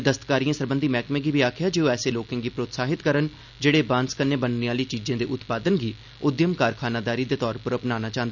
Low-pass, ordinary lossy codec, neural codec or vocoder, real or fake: 7.2 kHz; none; none; real